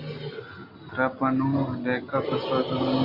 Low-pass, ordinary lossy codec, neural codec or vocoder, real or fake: 5.4 kHz; MP3, 48 kbps; none; real